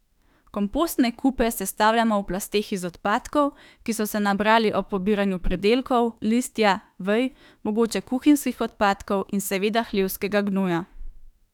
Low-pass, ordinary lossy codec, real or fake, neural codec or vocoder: 19.8 kHz; none; fake; autoencoder, 48 kHz, 32 numbers a frame, DAC-VAE, trained on Japanese speech